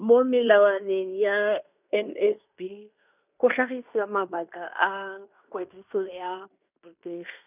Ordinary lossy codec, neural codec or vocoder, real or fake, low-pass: none; codec, 16 kHz in and 24 kHz out, 0.9 kbps, LongCat-Audio-Codec, fine tuned four codebook decoder; fake; 3.6 kHz